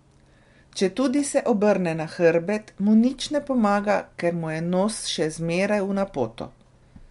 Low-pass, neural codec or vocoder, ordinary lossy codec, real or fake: 10.8 kHz; none; MP3, 64 kbps; real